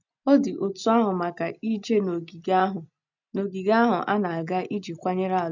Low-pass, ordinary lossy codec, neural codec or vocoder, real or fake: 7.2 kHz; none; none; real